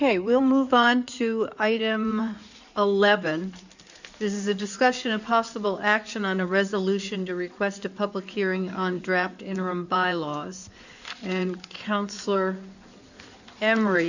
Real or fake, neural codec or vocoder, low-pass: fake; codec, 16 kHz in and 24 kHz out, 2.2 kbps, FireRedTTS-2 codec; 7.2 kHz